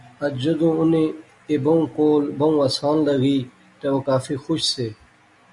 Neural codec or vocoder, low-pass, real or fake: none; 10.8 kHz; real